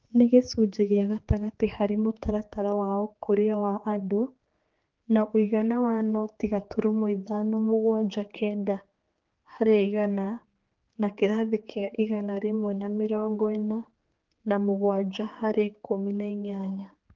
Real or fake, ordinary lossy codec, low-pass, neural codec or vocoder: fake; Opus, 16 kbps; 7.2 kHz; codec, 16 kHz, 4 kbps, X-Codec, HuBERT features, trained on general audio